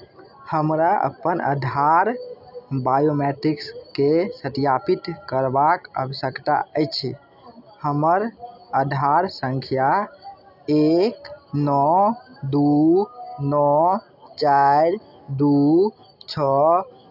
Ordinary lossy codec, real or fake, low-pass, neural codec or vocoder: none; real; 5.4 kHz; none